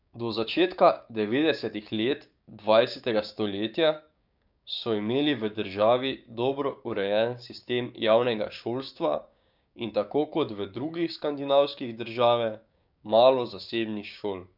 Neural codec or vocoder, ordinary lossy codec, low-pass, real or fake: codec, 16 kHz, 6 kbps, DAC; none; 5.4 kHz; fake